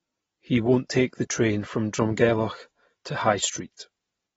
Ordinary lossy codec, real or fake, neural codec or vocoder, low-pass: AAC, 24 kbps; real; none; 19.8 kHz